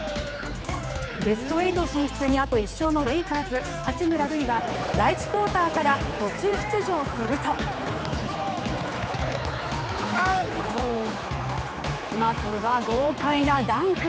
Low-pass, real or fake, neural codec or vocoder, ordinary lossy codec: none; fake; codec, 16 kHz, 4 kbps, X-Codec, HuBERT features, trained on general audio; none